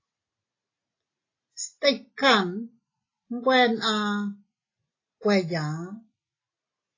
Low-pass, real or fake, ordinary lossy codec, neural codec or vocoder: 7.2 kHz; real; AAC, 32 kbps; none